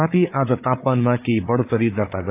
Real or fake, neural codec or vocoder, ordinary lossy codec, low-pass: fake; codec, 16 kHz, 8 kbps, FreqCodec, larger model; AAC, 24 kbps; 3.6 kHz